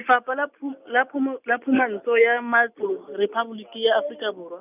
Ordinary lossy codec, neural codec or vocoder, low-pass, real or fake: none; none; 3.6 kHz; real